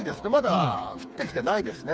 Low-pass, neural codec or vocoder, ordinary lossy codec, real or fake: none; codec, 16 kHz, 4 kbps, FreqCodec, smaller model; none; fake